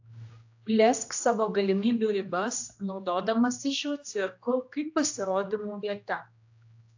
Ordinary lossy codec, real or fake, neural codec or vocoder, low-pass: AAC, 48 kbps; fake; codec, 16 kHz, 1 kbps, X-Codec, HuBERT features, trained on general audio; 7.2 kHz